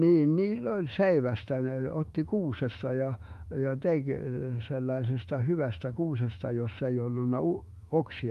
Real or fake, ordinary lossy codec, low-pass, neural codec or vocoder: fake; Opus, 32 kbps; 14.4 kHz; autoencoder, 48 kHz, 32 numbers a frame, DAC-VAE, trained on Japanese speech